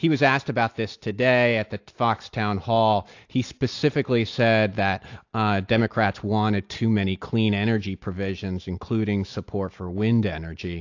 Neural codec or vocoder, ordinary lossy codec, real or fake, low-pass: none; AAC, 48 kbps; real; 7.2 kHz